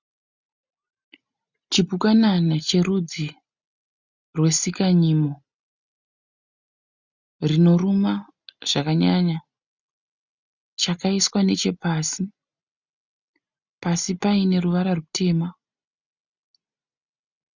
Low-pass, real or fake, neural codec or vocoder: 7.2 kHz; real; none